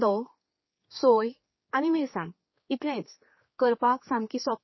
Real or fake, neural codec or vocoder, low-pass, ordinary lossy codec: fake; codec, 16 kHz, 4 kbps, X-Codec, HuBERT features, trained on general audio; 7.2 kHz; MP3, 24 kbps